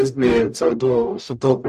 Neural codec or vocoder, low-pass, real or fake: codec, 44.1 kHz, 0.9 kbps, DAC; 14.4 kHz; fake